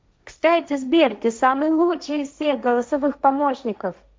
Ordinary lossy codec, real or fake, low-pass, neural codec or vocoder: none; fake; none; codec, 16 kHz, 1.1 kbps, Voila-Tokenizer